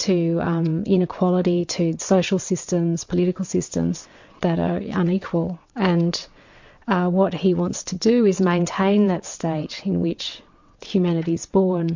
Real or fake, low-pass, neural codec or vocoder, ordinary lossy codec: fake; 7.2 kHz; vocoder, 22.05 kHz, 80 mel bands, WaveNeXt; MP3, 48 kbps